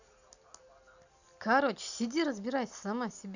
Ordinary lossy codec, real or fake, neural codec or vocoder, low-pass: none; real; none; 7.2 kHz